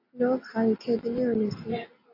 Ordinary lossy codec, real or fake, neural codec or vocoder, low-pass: AAC, 32 kbps; real; none; 5.4 kHz